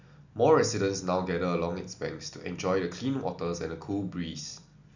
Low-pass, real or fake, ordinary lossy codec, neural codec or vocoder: 7.2 kHz; real; none; none